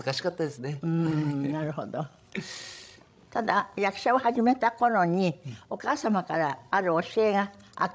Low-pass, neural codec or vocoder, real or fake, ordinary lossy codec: none; codec, 16 kHz, 16 kbps, FreqCodec, larger model; fake; none